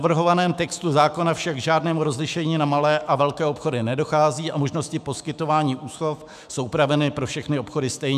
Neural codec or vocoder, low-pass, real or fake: autoencoder, 48 kHz, 128 numbers a frame, DAC-VAE, trained on Japanese speech; 14.4 kHz; fake